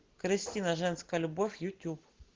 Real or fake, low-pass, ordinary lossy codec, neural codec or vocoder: real; 7.2 kHz; Opus, 16 kbps; none